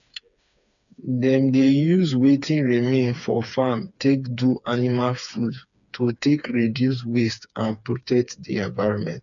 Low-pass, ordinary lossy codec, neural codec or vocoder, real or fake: 7.2 kHz; none; codec, 16 kHz, 4 kbps, FreqCodec, smaller model; fake